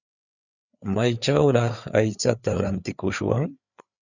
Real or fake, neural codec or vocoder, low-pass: fake; codec, 16 kHz, 4 kbps, FreqCodec, larger model; 7.2 kHz